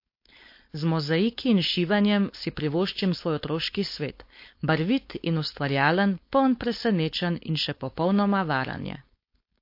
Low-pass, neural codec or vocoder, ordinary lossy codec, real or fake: 5.4 kHz; codec, 16 kHz, 4.8 kbps, FACodec; MP3, 32 kbps; fake